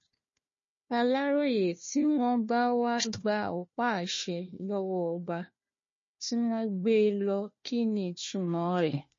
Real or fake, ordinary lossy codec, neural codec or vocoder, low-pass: fake; MP3, 32 kbps; codec, 16 kHz, 1 kbps, FunCodec, trained on Chinese and English, 50 frames a second; 7.2 kHz